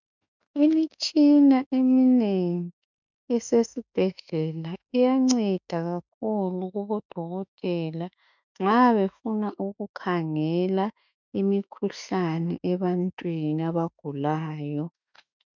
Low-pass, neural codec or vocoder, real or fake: 7.2 kHz; autoencoder, 48 kHz, 32 numbers a frame, DAC-VAE, trained on Japanese speech; fake